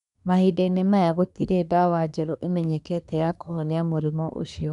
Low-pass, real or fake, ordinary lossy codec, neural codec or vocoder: 10.8 kHz; fake; none; codec, 24 kHz, 1 kbps, SNAC